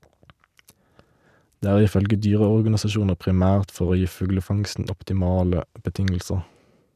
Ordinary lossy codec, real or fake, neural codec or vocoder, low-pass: none; real; none; 14.4 kHz